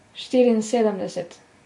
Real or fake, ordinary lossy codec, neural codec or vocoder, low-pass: real; MP3, 48 kbps; none; 10.8 kHz